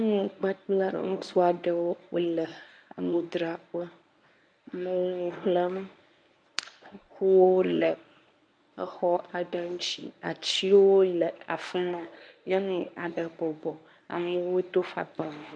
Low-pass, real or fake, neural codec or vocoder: 9.9 kHz; fake; codec, 24 kHz, 0.9 kbps, WavTokenizer, medium speech release version 1